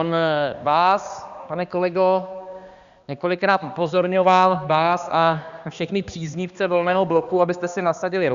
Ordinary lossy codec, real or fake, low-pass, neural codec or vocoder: Opus, 64 kbps; fake; 7.2 kHz; codec, 16 kHz, 2 kbps, X-Codec, HuBERT features, trained on balanced general audio